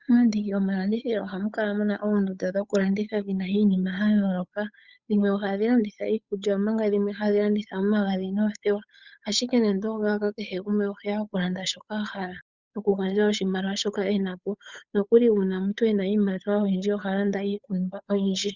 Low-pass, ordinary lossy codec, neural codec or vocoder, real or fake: 7.2 kHz; Opus, 64 kbps; codec, 16 kHz, 8 kbps, FunCodec, trained on Chinese and English, 25 frames a second; fake